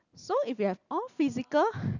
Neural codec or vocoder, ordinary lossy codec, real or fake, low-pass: none; none; real; 7.2 kHz